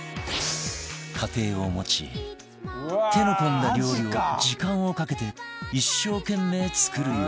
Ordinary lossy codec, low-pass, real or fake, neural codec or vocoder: none; none; real; none